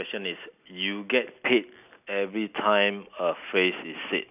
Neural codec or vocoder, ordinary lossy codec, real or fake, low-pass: none; none; real; 3.6 kHz